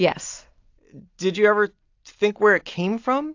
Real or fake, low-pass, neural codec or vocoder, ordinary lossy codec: real; 7.2 kHz; none; AAC, 48 kbps